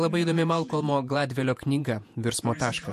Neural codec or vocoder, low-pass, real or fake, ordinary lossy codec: vocoder, 48 kHz, 128 mel bands, Vocos; 14.4 kHz; fake; MP3, 64 kbps